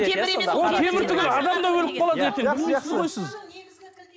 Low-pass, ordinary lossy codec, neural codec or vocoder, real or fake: none; none; none; real